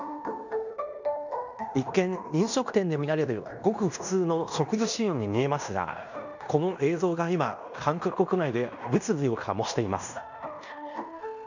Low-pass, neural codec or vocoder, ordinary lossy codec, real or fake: 7.2 kHz; codec, 16 kHz in and 24 kHz out, 0.9 kbps, LongCat-Audio-Codec, fine tuned four codebook decoder; none; fake